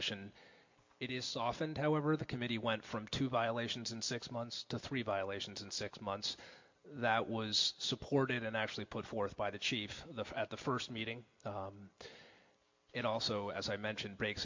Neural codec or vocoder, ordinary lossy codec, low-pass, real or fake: none; MP3, 48 kbps; 7.2 kHz; real